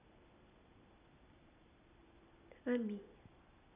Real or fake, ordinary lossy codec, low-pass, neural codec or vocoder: real; none; 3.6 kHz; none